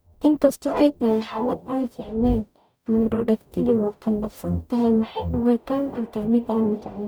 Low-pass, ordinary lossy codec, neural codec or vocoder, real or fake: none; none; codec, 44.1 kHz, 0.9 kbps, DAC; fake